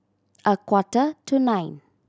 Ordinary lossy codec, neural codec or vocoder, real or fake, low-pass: none; none; real; none